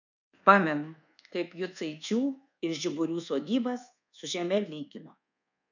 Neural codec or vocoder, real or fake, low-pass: codec, 24 kHz, 1.2 kbps, DualCodec; fake; 7.2 kHz